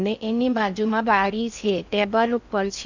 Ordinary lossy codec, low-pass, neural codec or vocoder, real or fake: none; 7.2 kHz; codec, 16 kHz in and 24 kHz out, 0.6 kbps, FocalCodec, streaming, 4096 codes; fake